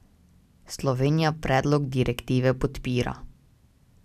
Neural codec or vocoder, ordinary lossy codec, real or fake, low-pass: none; none; real; 14.4 kHz